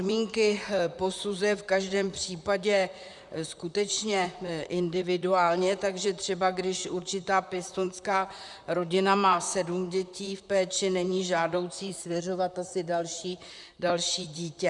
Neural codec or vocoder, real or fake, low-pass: vocoder, 44.1 kHz, 128 mel bands, Pupu-Vocoder; fake; 10.8 kHz